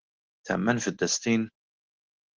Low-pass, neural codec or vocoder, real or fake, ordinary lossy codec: 7.2 kHz; none; real; Opus, 16 kbps